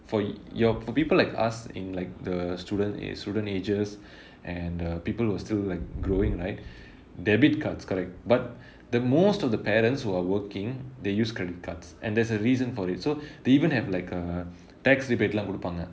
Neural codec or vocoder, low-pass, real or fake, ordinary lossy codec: none; none; real; none